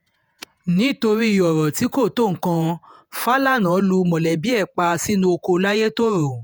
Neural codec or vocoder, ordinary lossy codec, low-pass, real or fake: vocoder, 48 kHz, 128 mel bands, Vocos; none; none; fake